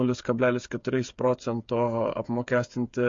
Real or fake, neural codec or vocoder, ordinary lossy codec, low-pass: fake; codec, 16 kHz, 8 kbps, FreqCodec, smaller model; MP3, 48 kbps; 7.2 kHz